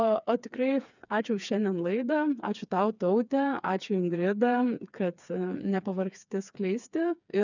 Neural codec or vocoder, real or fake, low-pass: codec, 16 kHz, 4 kbps, FreqCodec, smaller model; fake; 7.2 kHz